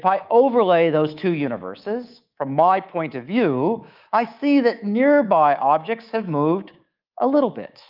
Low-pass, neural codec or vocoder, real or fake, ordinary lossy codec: 5.4 kHz; codec, 24 kHz, 3.1 kbps, DualCodec; fake; Opus, 32 kbps